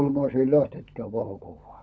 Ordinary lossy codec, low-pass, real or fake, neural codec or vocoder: none; none; fake; codec, 16 kHz, 16 kbps, FunCodec, trained on LibriTTS, 50 frames a second